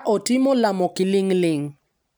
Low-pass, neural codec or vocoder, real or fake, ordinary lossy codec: none; none; real; none